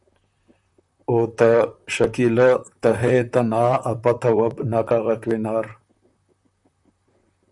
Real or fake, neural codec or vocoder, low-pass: fake; vocoder, 44.1 kHz, 128 mel bands, Pupu-Vocoder; 10.8 kHz